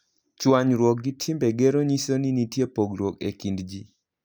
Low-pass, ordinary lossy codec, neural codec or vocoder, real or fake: none; none; none; real